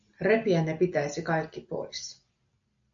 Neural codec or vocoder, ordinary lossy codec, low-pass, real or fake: none; AAC, 64 kbps; 7.2 kHz; real